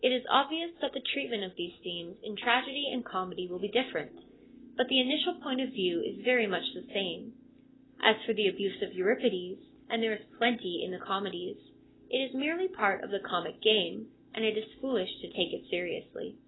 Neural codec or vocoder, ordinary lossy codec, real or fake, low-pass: none; AAC, 16 kbps; real; 7.2 kHz